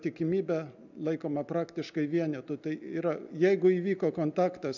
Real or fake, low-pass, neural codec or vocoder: real; 7.2 kHz; none